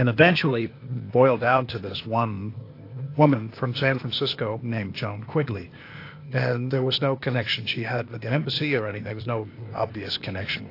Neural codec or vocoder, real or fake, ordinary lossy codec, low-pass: codec, 16 kHz, 0.8 kbps, ZipCodec; fake; AAC, 32 kbps; 5.4 kHz